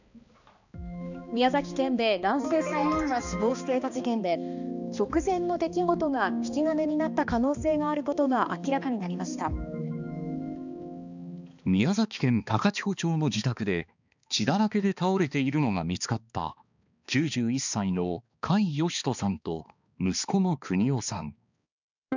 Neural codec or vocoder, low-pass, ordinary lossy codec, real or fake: codec, 16 kHz, 2 kbps, X-Codec, HuBERT features, trained on balanced general audio; 7.2 kHz; none; fake